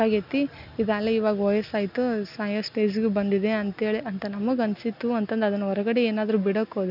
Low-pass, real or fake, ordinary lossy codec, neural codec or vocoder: 5.4 kHz; real; none; none